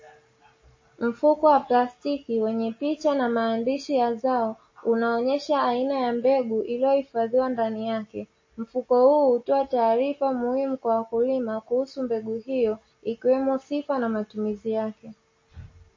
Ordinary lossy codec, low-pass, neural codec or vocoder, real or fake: MP3, 32 kbps; 7.2 kHz; none; real